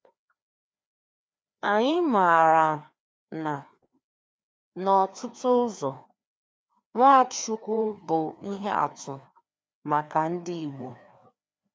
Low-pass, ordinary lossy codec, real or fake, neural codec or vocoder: none; none; fake; codec, 16 kHz, 2 kbps, FreqCodec, larger model